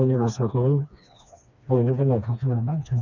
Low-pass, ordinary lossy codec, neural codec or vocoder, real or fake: 7.2 kHz; none; codec, 16 kHz, 2 kbps, FreqCodec, smaller model; fake